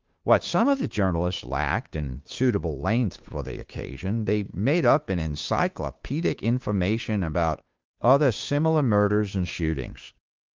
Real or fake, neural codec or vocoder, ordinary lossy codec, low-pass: fake; codec, 16 kHz, 2 kbps, FunCodec, trained on Chinese and English, 25 frames a second; Opus, 24 kbps; 7.2 kHz